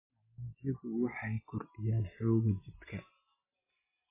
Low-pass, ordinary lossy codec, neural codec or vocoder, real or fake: 3.6 kHz; MP3, 16 kbps; none; real